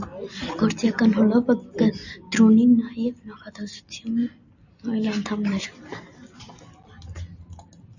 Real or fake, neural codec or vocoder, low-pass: real; none; 7.2 kHz